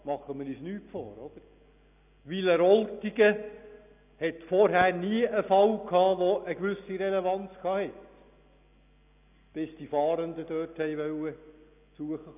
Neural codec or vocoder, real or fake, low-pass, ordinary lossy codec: none; real; 3.6 kHz; none